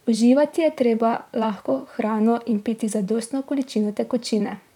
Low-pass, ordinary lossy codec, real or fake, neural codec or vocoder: 19.8 kHz; none; fake; vocoder, 44.1 kHz, 128 mel bands, Pupu-Vocoder